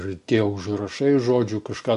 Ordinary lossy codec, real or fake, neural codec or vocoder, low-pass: MP3, 48 kbps; real; none; 14.4 kHz